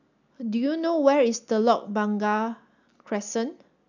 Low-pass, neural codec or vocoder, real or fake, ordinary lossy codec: 7.2 kHz; none; real; none